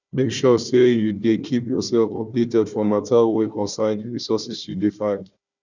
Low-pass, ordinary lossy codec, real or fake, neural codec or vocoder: 7.2 kHz; none; fake; codec, 16 kHz, 1 kbps, FunCodec, trained on Chinese and English, 50 frames a second